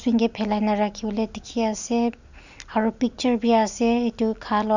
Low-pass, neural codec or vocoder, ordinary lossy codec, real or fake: 7.2 kHz; none; none; real